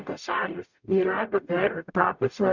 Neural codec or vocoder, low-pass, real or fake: codec, 44.1 kHz, 0.9 kbps, DAC; 7.2 kHz; fake